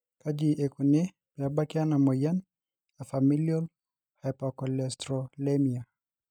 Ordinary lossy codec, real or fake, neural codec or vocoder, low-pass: none; real; none; 19.8 kHz